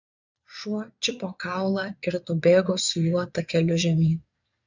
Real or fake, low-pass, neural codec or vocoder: fake; 7.2 kHz; vocoder, 22.05 kHz, 80 mel bands, Vocos